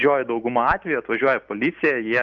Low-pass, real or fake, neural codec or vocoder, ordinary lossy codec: 7.2 kHz; real; none; Opus, 24 kbps